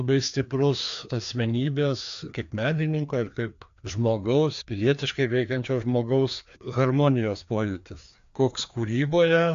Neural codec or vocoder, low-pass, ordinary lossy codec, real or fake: codec, 16 kHz, 2 kbps, FreqCodec, larger model; 7.2 kHz; AAC, 64 kbps; fake